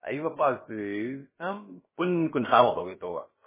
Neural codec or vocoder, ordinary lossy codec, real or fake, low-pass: codec, 16 kHz, about 1 kbps, DyCAST, with the encoder's durations; MP3, 16 kbps; fake; 3.6 kHz